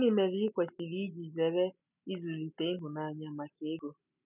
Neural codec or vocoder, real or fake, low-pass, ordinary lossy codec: none; real; 3.6 kHz; none